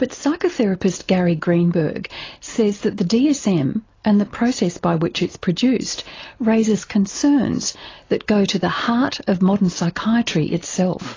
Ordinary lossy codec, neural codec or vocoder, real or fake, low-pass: AAC, 32 kbps; none; real; 7.2 kHz